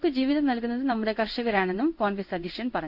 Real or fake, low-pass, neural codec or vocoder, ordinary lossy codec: fake; 5.4 kHz; codec, 16 kHz in and 24 kHz out, 1 kbps, XY-Tokenizer; none